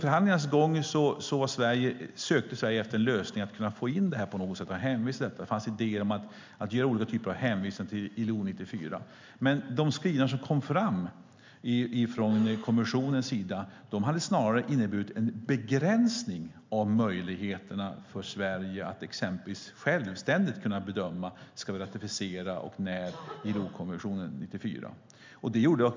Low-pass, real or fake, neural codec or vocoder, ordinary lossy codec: 7.2 kHz; real; none; MP3, 64 kbps